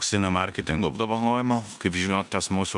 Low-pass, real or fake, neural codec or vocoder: 10.8 kHz; fake; codec, 16 kHz in and 24 kHz out, 0.9 kbps, LongCat-Audio-Codec, four codebook decoder